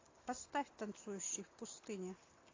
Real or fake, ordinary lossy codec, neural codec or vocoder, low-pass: real; AAC, 32 kbps; none; 7.2 kHz